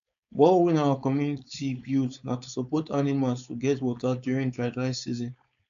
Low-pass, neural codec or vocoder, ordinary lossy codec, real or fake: 7.2 kHz; codec, 16 kHz, 4.8 kbps, FACodec; AAC, 96 kbps; fake